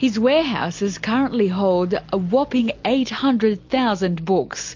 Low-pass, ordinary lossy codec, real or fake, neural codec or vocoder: 7.2 kHz; MP3, 48 kbps; real; none